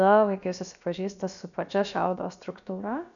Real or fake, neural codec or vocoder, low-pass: fake; codec, 16 kHz, about 1 kbps, DyCAST, with the encoder's durations; 7.2 kHz